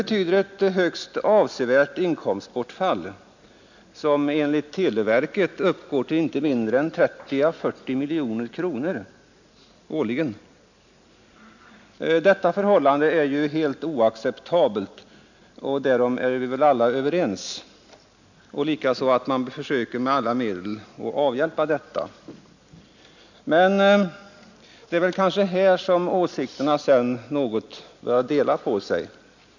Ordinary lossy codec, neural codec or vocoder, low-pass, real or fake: none; none; 7.2 kHz; real